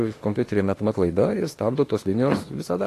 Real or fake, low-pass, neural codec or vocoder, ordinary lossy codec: fake; 14.4 kHz; autoencoder, 48 kHz, 32 numbers a frame, DAC-VAE, trained on Japanese speech; AAC, 48 kbps